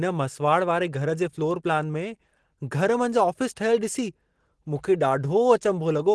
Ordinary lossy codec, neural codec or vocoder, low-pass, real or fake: Opus, 16 kbps; none; 10.8 kHz; real